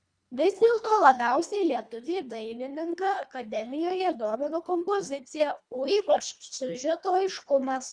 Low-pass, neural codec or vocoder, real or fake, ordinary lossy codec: 9.9 kHz; codec, 24 kHz, 1.5 kbps, HILCodec; fake; Opus, 64 kbps